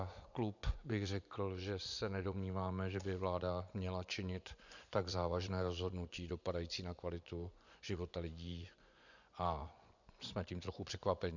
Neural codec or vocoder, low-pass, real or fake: vocoder, 24 kHz, 100 mel bands, Vocos; 7.2 kHz; fake